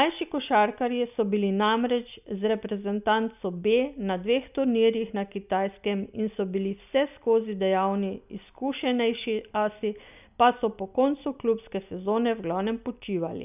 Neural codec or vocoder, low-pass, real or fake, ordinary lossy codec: none; 3.6 kHz; real; none